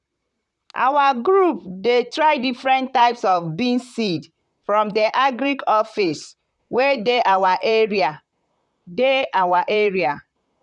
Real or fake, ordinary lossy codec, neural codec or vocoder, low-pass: fake; none; vocoder, 44.1 kHz, 128 mel bands, Pupu-Vocoder; 10.8 kHz